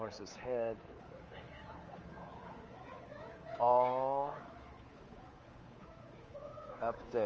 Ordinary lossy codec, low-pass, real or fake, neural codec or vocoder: Opus, 24 kbps; 7.2 kHz; fake; codec, 24 kHz, 3.1 kbps, DualCodec